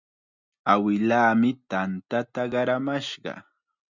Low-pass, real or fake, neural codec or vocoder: 7.2 kHz; real; none